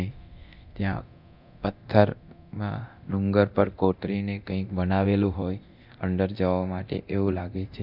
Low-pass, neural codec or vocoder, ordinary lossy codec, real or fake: 5.4 kHz; codec, 24 kHz, 0.9 kbps, DualCodec; none; fake